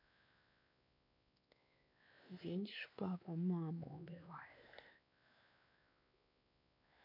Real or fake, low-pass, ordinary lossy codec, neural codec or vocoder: fake; 5.4 kHz; none; codec, 16 kHz, 2 kbps, X-Codec, WavLM features, trained on Multilingual LibriSpeech